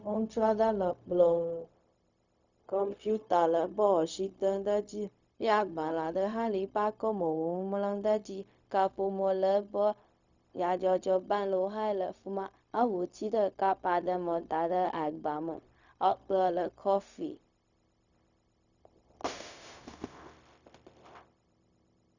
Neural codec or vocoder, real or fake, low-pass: codec, 16 kHz, 0.4 kbps, LongCat-Audio-Codec; fake; 7.2 kHz